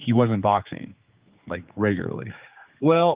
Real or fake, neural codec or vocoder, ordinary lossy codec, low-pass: fake; codec, 16 kHz, 4 kbps, X-Codec, HuBERT features, trained on general audio; Opus, 32 kbps; 3.6 kHz